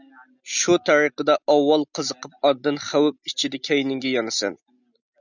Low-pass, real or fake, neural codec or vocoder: 7.2 kHz; real; none